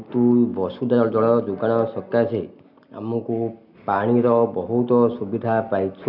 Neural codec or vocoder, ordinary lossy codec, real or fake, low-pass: none; none; real; 5.4 kHz